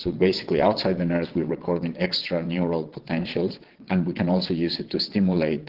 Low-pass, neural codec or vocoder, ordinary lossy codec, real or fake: 5.4 kHz; vocoder, 22.05 kHz, 80 mel bands, WaveNeXt; Opus, 16 kbps; fake